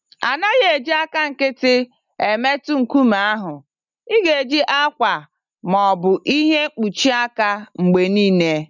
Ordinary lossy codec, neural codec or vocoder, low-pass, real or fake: none; none; 7.2 kHz; real